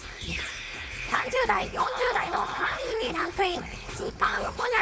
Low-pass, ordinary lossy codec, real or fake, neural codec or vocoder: none; none; fake; codec, 16 kHz, 4.8 kbps, FACodec